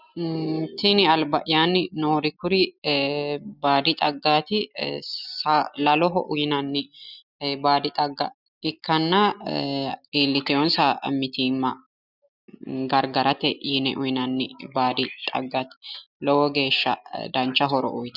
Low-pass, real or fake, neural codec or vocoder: 5.4 kHz; real; none